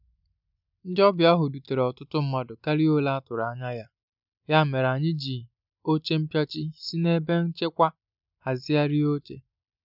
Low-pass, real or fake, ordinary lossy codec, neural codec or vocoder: 5.4 kHz; real; none; none